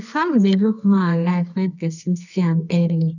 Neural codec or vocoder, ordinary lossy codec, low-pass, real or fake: codec, 24 kHz, 0.9 kbps, WavTokenizer, medium music audio release; none; 7.2 kHz; fake